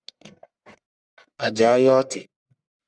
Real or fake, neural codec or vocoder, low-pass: fake; codec, 44.1 kHz, 1.7 kbps, Pupu-Codec; 9.9 kHz